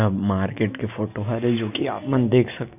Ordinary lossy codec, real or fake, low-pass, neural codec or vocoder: none; real; 3.6 kHz; none